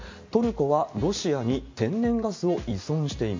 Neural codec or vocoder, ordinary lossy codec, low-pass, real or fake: none; MP3, 48 kbps; 7.2 kHz; real